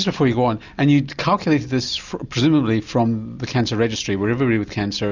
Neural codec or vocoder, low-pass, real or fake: none; 7.2 kHz; real